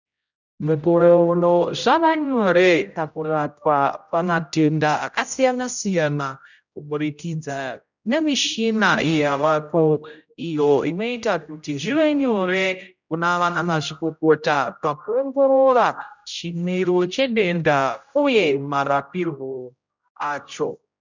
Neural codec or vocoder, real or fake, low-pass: codec, 16 kHz, 0.5 kbps, X-Codec, HuBERT features, trained on general audio; fake; 7.2 kHz